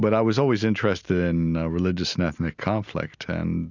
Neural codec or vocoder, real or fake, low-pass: none; real; 7.2 kHz